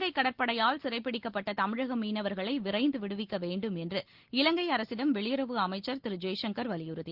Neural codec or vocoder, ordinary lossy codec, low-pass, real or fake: none; Opus, 16 kbps; 5.4 kHz; real